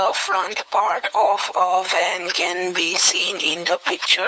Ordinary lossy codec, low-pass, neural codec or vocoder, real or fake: none; none; codec, 16 kHz, 8 kbps, FunCodec, trained on LibriTTS, 25 frames a second; fake